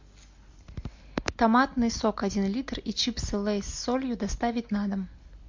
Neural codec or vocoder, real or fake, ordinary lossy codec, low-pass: none; real; MP3, 48 kbps; 7.2 kHz